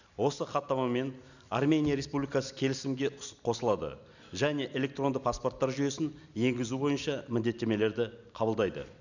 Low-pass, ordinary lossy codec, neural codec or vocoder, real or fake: 7.2 kHz; none; none; real